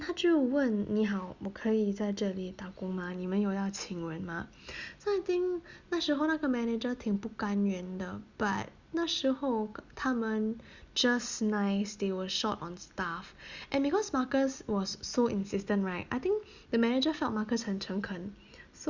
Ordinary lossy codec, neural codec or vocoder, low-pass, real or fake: Opus, 64 kbps; none; 7.2 kHz; real